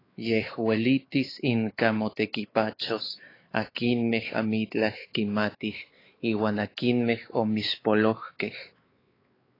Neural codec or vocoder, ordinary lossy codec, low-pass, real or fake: codec, 16 kHz, 4 kbps, X-Codec, WavLM features, trained on Multilingual LibriSpeech; AAC, 24 kbps; 5.4 kHz; fake